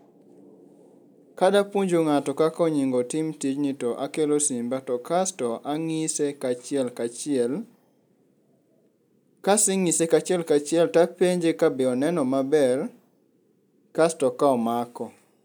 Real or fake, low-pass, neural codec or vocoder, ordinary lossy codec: real; none; none; none